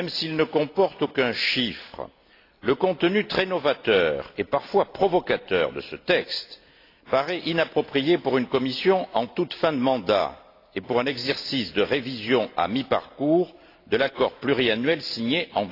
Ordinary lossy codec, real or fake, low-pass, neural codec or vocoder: AAC, 32 kbps; real; 5.4 kHz; none